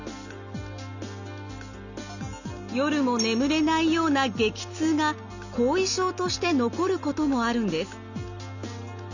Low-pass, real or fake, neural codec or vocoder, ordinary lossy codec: 7.2 kHz; real; none; none